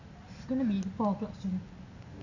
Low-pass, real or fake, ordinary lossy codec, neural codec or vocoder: 7.2 kHz; real; none; none